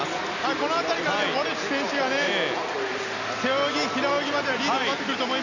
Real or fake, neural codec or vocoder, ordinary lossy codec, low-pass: real; none; none; 7.2 kHz